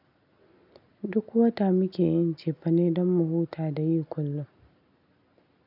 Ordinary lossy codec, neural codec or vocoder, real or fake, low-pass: none; none; real; 5.4 kHz